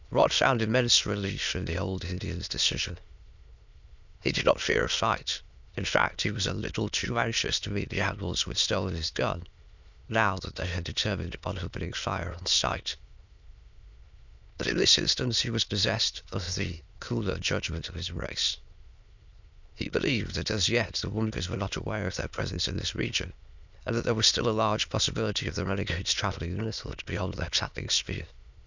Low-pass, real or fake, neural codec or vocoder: 7.2 kHz; fake; autoencoder, 22.05 kHz, a latent of 192 numbers a frame, VITS, trained on many speakers